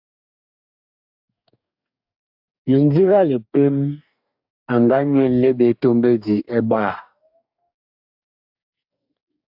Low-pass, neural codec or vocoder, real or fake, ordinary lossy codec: 5.4 kHz; codec, 44.1 kHz, 2.6 kbps, DAC; fake; AAC, 48 kbps